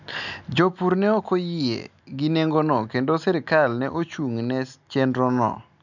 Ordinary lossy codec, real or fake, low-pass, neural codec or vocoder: none; real; 7.2 kHz; none